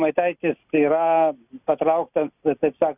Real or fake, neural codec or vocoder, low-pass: real; none; 3.6 kHz